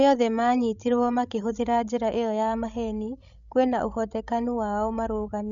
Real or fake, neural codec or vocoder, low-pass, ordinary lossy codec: fake; codec, 16 kHz, 16 kbps, FreqCodec, larger model; 7.2 kHz; none